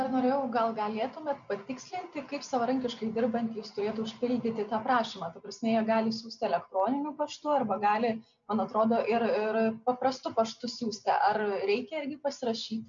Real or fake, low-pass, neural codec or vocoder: real; 7.2 kHz; none